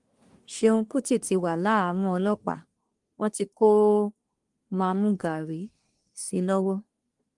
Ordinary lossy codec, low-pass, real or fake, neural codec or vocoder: Opus, 32 kbps; 10.8 kHz; fake; codec, 24 kHz, 1 kbps, SNAC